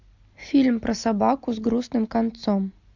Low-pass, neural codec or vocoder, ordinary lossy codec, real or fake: 7.2 kHz; none; MP3, 64 kbps; real